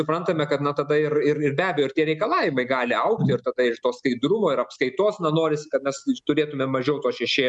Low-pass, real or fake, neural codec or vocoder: 10.8 kHz; real; none